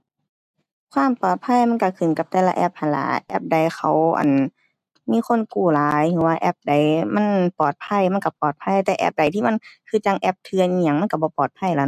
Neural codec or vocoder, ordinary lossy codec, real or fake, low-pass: none; none; real; 14.4 kHz